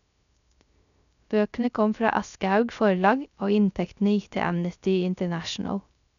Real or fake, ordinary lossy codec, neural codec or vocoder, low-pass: fake; none; codec, 16 kHz, 0.3 kbps, FocalCodec; 7.2 kHz